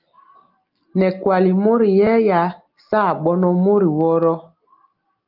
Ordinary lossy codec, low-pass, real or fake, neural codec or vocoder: Opus, 32 kbps; 5.4 kHz; real; none